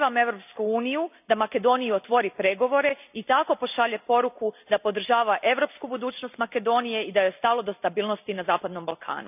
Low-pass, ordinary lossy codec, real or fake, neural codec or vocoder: 3.6 kHz; none; real; none